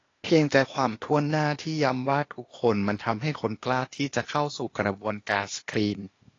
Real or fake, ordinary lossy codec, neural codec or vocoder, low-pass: fake; AAC, 32 kbps; codec, 16 kHz, 0.8 kbps, ZipCodec; 7.2 kHz